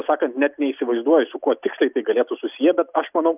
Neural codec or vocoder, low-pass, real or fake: none; 3.6 kHz; real